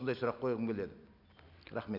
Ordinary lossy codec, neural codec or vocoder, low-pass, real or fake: none; none; 5.4 kHz; real